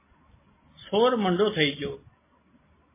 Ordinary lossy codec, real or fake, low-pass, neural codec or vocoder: MP3, 16 kbps; real; 3.6 kHz; none